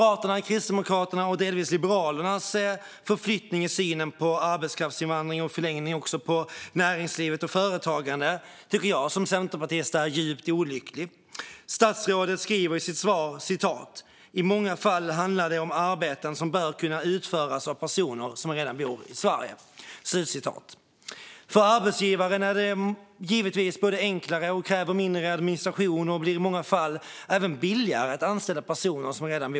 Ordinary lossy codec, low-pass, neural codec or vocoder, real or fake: none; none; none; real